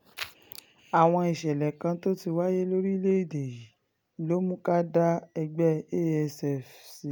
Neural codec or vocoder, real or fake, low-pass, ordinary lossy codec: none; real; none; none